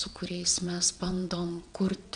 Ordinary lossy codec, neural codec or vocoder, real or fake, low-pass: MP3, 96 kbps; vocoder, 22.05 kHz, 80 mel bands, WaveNeXt; fake; 9.9 kHz